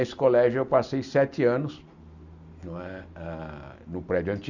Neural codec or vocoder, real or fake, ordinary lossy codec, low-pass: none; real; none; 7.2 kHz